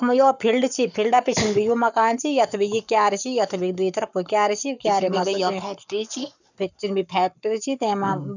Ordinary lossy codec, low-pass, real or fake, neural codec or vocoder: none; 7.2 kHz; fake; codec, 44.1 kHz, 7.8 kbps, Pupu-Codec